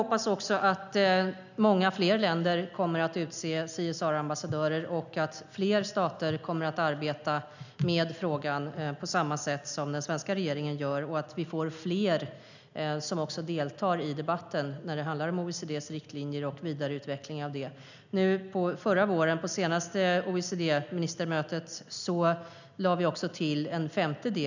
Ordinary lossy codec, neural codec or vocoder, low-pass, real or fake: none; none; 7.2 kHz; real